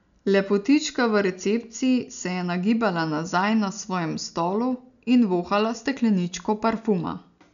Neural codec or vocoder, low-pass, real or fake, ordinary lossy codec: none; 7.2 kHz; real; MP3, 96 kbps